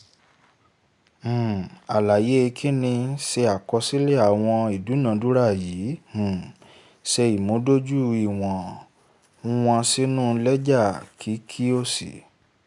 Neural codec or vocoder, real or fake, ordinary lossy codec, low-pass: none; real; MP3, 96 kbps; 10.8 kHz